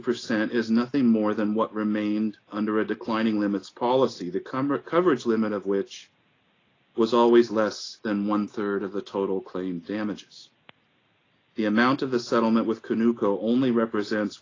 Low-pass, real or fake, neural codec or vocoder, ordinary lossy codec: 7.2 kHz; real; none; AAC, 32 kbps